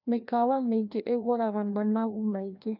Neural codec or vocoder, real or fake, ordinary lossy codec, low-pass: codec, 16 kHz, 1 kbps, FreqCodec, larger model; fake; none; 5.4 kHz